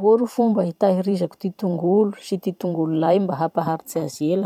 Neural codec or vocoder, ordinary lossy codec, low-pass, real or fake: vocoder, 44.1 kHz, 128 mel bands every 512 samples, BigVGAN v2; none; 19.8 kHz; fake